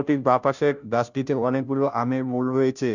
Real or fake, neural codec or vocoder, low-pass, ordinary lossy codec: fake; codec, 16 kHz, 0.5 kbps, FunCodec, trained on Chinese and English, 25 frames a second; 7.2 kHz; none